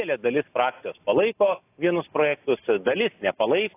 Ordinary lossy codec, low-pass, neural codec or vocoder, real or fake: AAC, 24 kbps; 3.6 kHz; none; real